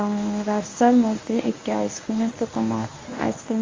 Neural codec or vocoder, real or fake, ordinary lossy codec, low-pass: codec, 24 kHz, 0.9 kbps, WavTokenizer, medium speech release version 1; fake; Opus, 32 kbps; 7.2 kHz